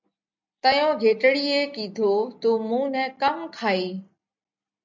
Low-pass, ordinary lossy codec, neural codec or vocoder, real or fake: 7.2 kHz; MP3, 64 kbps; none; real